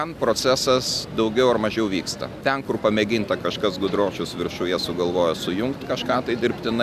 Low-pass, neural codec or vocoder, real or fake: 14.4 kHz; none; real